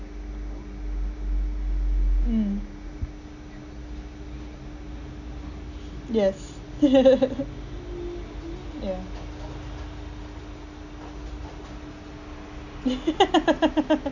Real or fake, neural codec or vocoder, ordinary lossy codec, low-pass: real; none; none; 7.2 kHz